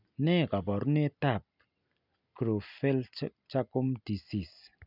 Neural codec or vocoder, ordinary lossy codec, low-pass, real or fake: none; none; 5.4 kHz; real